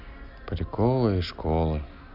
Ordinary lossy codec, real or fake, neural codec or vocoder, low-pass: none; real; none; 5.4 kHz